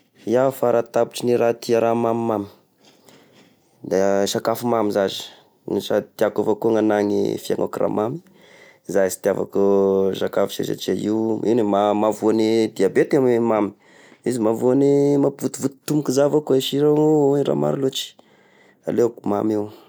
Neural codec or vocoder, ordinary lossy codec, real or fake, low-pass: none; none; real; none